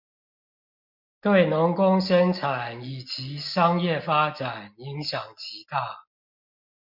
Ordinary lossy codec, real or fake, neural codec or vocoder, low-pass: MP3, 48 kbps; real; none; 5.4 kHz